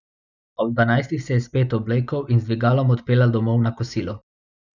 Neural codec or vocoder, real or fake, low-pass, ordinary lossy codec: none; real; 7.2 kHz; none